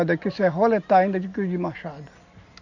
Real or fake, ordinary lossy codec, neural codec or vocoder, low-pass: real; none; none; 7.2 kHz